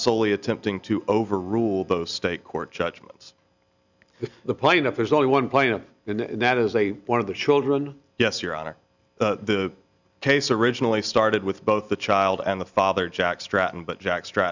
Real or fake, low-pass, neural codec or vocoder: real; 7.2 kHz; none